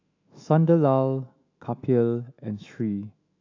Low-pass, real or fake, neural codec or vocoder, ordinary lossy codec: 7.2 kHz; fake; autoencoder, 48 kHz, 128 numbers a frame, DAC-VAE, trained on Japanese speech; AAC, 48 kbps